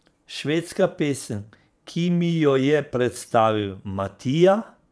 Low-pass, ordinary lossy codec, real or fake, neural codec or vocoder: none; none; fake; vocoder, 22.05 kHz, 80 mel bands, Vocos